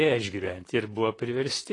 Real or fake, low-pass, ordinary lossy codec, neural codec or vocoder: fake; 10.8 kHz; AAC, 32 kbps; vocoder, 44.1 kHz, 128 mel bands, Pupu-Vocoder